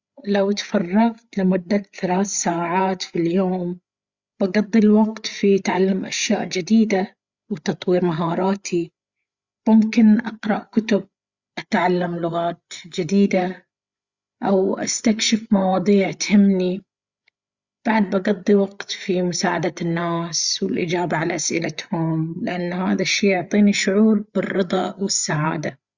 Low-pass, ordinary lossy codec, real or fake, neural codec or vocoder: 7.2 kHz; Opus, 64 kbps; fake; codec, 16 kHz, 8 kbps, FreqCodec, larger model